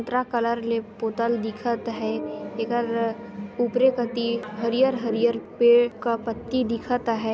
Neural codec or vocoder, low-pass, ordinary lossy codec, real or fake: none; none; none; real